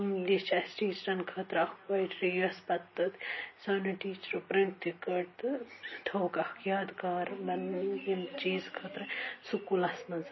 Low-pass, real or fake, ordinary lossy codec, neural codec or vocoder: 7.2 kHz; real; MP3, 24 kbps; none